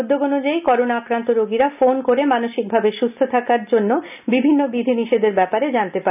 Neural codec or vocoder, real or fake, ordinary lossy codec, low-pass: none; real; none; 3.6 kHz